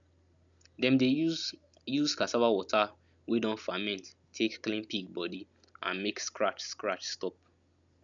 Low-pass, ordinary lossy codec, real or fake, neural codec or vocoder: 7.2 kHz; none; real; none